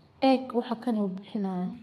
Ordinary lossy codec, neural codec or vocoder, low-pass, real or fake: MP3, 64 kbps; codec, 32 kHz, 1.9 kbps, SNAC; 14.4 kHz; fake